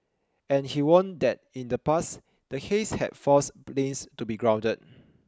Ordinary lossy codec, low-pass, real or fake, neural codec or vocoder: none; none; real; none